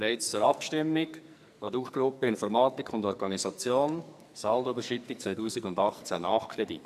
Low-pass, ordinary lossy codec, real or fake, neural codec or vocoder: 14.4 kHz; none; fake; codec, 44.1 kHz, 2.6 kbps, SNAC